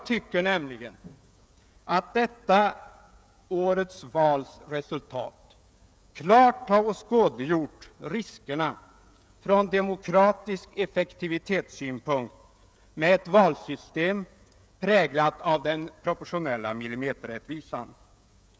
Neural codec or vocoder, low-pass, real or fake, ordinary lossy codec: codec, 16 kHz, 16 kbps, FreqCodec, smaller model; none; fake; none